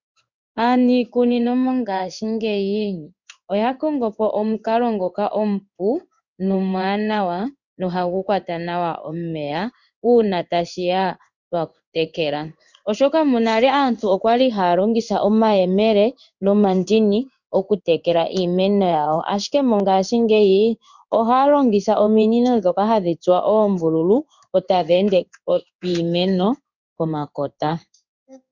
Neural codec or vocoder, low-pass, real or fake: codec, 16 kHz in and 24 kHz out, 1 kbps, XY-Tokenizer; 7.2 kHz; fake